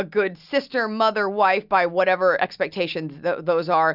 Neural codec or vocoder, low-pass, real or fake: none; 5.4 kHz; real